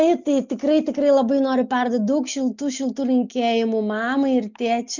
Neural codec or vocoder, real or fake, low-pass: none; real; 7.2 kHz